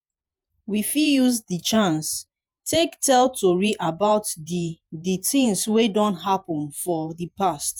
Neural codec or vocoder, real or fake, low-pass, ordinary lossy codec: vocoder, 48 kHz, 128 mel bands, Vocos; fake; none; none